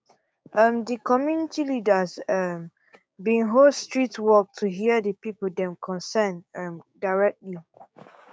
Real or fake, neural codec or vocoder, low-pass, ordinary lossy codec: fake; codec, 16 kHz, 6 kbps, DAC; none; none